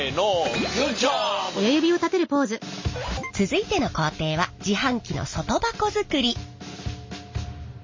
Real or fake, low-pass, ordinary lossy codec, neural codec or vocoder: real; 7.2 kHz; MP3, 32 kbps; none